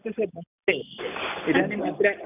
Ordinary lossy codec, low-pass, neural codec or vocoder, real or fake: none; 3.6 kHz; none; real